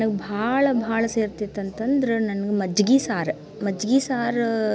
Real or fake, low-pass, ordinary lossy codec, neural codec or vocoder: real; none; none; none